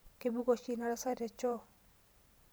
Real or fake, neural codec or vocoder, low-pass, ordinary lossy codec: fake; vocoder, 44.1 kHz, 128 mel bands every 512 samples, BigVGAN v2; none; none